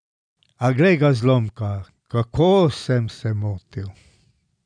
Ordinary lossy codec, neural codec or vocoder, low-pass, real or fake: none; none; 9.9 kHz; real